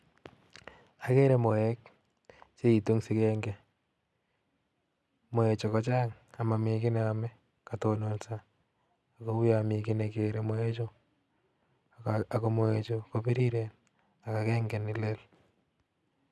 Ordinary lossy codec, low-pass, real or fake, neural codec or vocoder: none; none; real; none